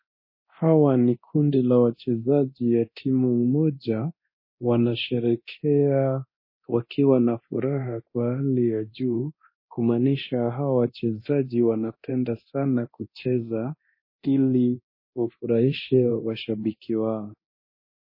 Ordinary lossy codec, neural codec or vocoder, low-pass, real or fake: MP3, 24 kbps; codec, 24 kHz, 0.9 kbps, DualCodec; 5.4 kHz; fake